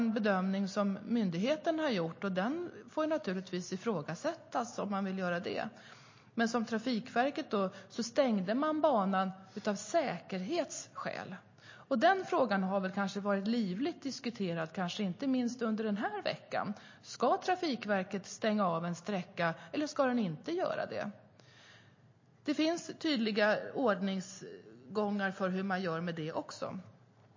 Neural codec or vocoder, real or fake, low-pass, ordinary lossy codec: none; real; 7.2 kHz; MP3, 32 kbps